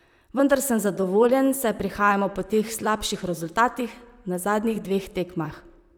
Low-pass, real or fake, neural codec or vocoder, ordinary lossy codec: none; fake; vocoder, 44.1 kHz, 128 mel bands, Pupu-Vocoder; none